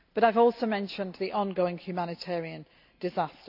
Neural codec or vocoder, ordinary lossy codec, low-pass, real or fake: none; none; 5.4 kHz; real